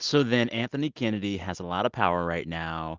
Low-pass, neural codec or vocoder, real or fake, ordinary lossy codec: 7.2 kHz; none; real; Opus, 32 kbps